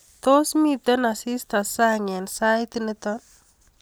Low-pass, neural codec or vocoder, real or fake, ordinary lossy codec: none; none; real; none